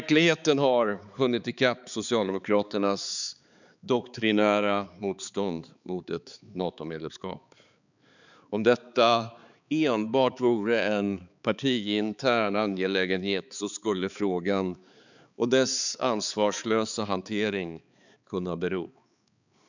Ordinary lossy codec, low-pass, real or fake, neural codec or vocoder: none; 7.2 kHz; fake; codec, 16 kHz, 4 kbps, X-Codec, HuBERT features, trained on balanced general audio